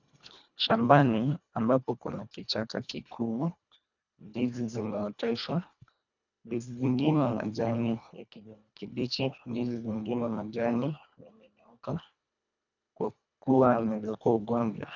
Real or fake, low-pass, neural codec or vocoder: fake; 7.2 kHz; codec, 24 kHz, 1.5 kbps, HILCodec